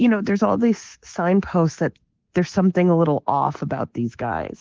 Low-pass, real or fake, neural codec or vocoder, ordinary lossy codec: 7.2 kHz; fake; codec, 24 kHz, 3.1 kbps, DualCodec; Opus, 16 kbps